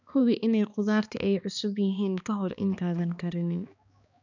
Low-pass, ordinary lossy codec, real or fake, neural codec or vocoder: 7.2 kHz; none; fake; codec, 16 kHz, 2 kbps, X-Codec, HuBERT features, trained on balanced general audio